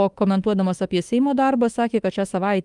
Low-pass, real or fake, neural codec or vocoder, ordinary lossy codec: 10.8 kHz; fake; autoencoder, 48 kHz, 32 numbers a frame, DAC-VAE, trained on Japanese speech; Opus, 32 kbps